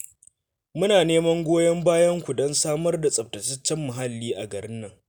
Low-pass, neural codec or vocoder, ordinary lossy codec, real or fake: none; none; none; real